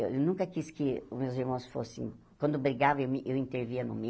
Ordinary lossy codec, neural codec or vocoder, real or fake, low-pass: none; none; real; none